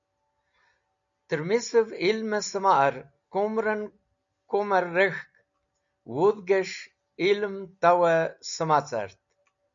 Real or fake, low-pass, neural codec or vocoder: real; 7.2 kHz; none